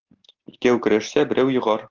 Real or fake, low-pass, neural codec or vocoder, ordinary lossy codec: real; 7.2 kHz; none; Opus, 16 kbps